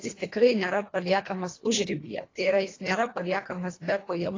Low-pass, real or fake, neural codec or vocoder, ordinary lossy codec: 7.2 kHz; fake; codec, 24 kHz, 1.5 kbps, HILCodec; AAC, 32 kbps